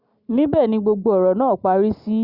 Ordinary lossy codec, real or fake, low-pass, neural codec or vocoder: Opus, 64 kbps; real; 5.4 kHz; none